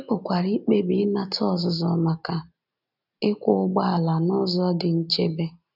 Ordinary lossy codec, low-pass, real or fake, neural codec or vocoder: none; 5.4 kHz; real; none